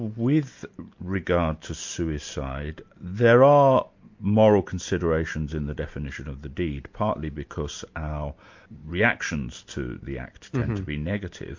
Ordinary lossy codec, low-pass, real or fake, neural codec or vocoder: MP3, 48 kbps; 7.2 kHz; real; none